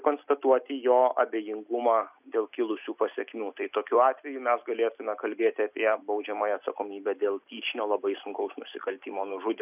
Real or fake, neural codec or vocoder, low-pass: real; none; 3.6 kHz